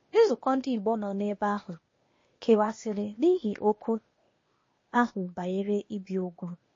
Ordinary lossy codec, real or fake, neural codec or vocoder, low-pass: MP3, 32 kbps; fake; codec, 16 kHz, 0.8 kbps, ZipCodec; 7.2 kHz